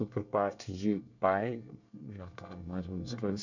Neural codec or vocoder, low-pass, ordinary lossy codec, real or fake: codec, 24 kHz, 1 kbps, SNAC; 7.2 kHz; none; fake